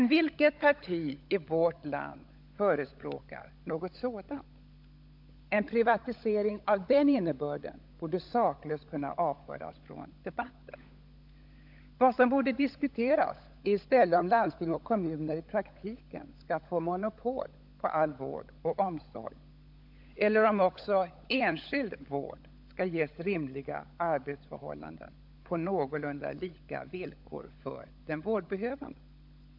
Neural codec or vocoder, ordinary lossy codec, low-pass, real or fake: codec, 16 kHz, 16 kbps, FunCodec, trained on LibriTTS, 50 frames a second; AAC, 48 kbps; 5.4 kHz; fake